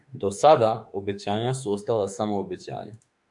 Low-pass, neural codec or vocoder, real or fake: 10.8 kHz; autoencoder, 48 kHz, 32 numbers a frame, DAC-VAE, trained on Japanese speech; fake